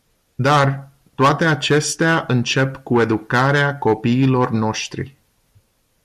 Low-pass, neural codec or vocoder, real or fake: 14.4 kHz; none; real